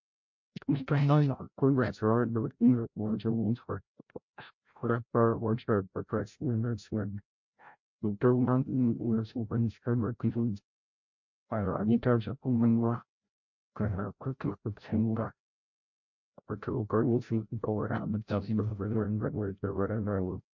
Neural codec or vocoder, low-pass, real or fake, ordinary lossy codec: codec, 16 kHz, 0.5 kbps, FreqCodec, larger model; 7.2 kHz; fake; MP3, 48 kbps